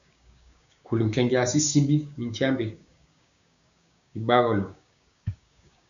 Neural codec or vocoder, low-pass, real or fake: codec, 16 kHz, 6 kbps, DAC; 7.2 kHz; fake